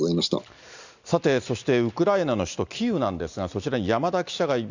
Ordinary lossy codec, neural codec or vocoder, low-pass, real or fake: Opus, 64 kbps; none; 7.2 kHz; real